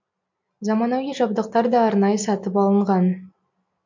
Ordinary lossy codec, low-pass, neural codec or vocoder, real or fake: MP3, 48 kbps; 7.2 kHz; none; real